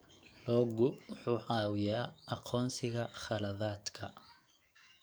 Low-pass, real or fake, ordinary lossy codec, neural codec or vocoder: none; fake; none; codec, 44.1 kHz, 7.8 kbps, DAC